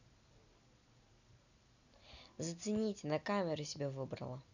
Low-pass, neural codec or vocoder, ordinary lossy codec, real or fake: 7.2 kHz; none; none; real